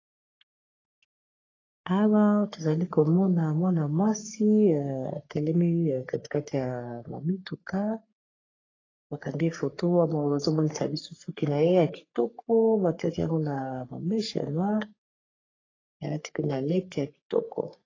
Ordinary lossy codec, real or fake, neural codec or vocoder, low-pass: AAC, 32 kbps; fake; codec, 44.1 kHz, 3.4 kbps, Pupu-Codec; 7.2 kHz